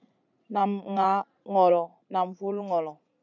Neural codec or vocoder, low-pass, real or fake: vocoder, 44.1 kHz, 80 mel bands, Vocos; 7.2 kHz; fake